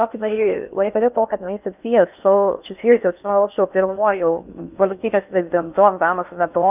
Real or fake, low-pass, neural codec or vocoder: fake; 3.6 kHz; codec, 16 kHz in and 24 kHz out, 0.6 kbps, FocalCodec, streaming, 4096 codes